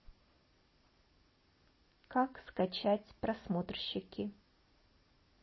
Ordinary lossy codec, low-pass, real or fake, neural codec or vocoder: MP3, 24 kbps; 7.2 kHz; real; none